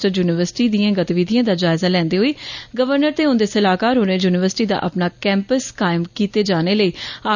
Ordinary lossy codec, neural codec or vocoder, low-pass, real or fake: none; none; 7.2 kHz; real